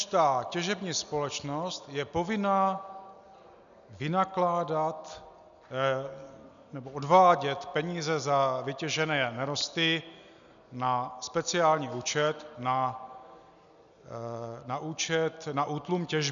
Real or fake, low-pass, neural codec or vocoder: real; 7.2 kHz; none